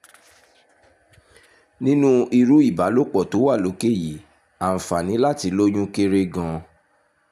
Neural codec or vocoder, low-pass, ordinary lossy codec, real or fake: none; 14.4 kHz; none; real